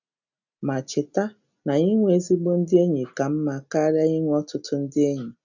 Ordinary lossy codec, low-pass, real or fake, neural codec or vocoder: none; 7.2 kHz; real; none